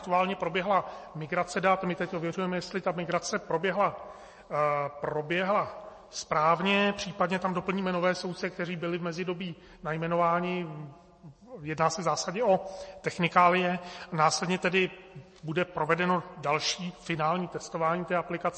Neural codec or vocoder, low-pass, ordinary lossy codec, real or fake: none; 10.8 kHz; MP3, 32 kbps; real